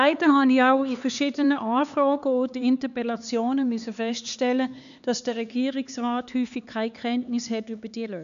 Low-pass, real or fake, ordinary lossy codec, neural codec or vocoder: 7.2 kHz; fake; none; codec, 16 kHz, 4 kbps, X-Codec, HuBERT features, trained on LibriSpeech